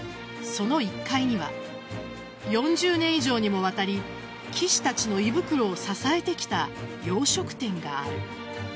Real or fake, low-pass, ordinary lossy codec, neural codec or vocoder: real; none; none; none